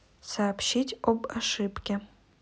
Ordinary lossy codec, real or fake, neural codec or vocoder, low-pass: none; real; none; none